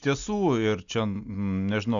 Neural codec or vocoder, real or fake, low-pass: none; real; 7.2 kHz